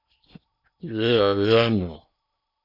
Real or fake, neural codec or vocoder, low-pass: fake; codec, 16 kHz in and 24 kHz out, 0.8 kbps, FocalCodec, streaming, 65536 codes; 5.4 kHz